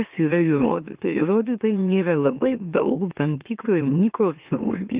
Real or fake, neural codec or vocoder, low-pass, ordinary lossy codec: fake; autoencoder, 44.1 kHz, a latent of 192 numbers a frame, MeloTTS; 3.6 kHz; Opus, 24 kbps